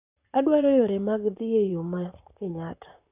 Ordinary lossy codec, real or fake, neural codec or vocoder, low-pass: none; fake; vocoder, 44.1 kHz, 128 mel bands, Pupu-Vocoder; 3.6 kHz